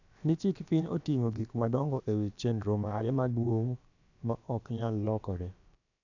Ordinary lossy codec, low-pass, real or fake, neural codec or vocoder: none; 7.2 kHz; fake; codec, 16 kHz, about 1 kbps, DyCAST, with the encoder's durations